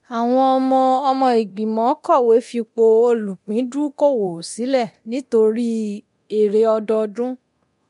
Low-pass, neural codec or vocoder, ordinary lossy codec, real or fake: 10.8 kHz; codec, 24 kHz, 0.9 kbps, DualCodec; MP3, 64 kbps; fake